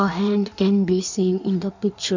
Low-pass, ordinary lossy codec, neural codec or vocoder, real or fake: 7.2 kHz; none; codec, 16 kHz in and 24 kHz out, 1.1 kbps, FireRedTTS-2 codec; fake